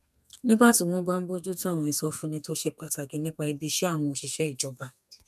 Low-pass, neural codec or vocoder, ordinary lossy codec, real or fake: 14.4 kHz; codec, 32 kHz, 1.9 kbps, SNAC; none; fake